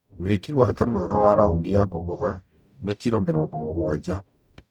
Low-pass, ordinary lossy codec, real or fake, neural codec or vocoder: 19.8 kHz; none; fake; codec, 44.1 kHz, 0.9 kbps, DAC